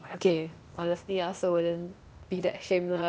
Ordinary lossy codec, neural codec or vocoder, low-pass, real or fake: none; codec, 16 kHz, 0.8 kbps, ZipCodec; none; fake